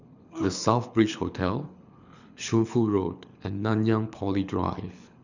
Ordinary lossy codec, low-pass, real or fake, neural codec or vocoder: none; 7.2 kHz; fake; codec, 24 kHz, 6 kbps, HILCodec